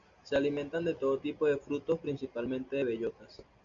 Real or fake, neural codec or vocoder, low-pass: real; none; 7.2 kHz